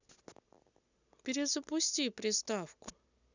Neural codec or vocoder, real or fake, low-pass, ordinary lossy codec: none; real; 7.2 kHz; none